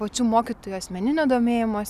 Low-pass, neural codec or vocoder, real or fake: 14.4 kHz; none; real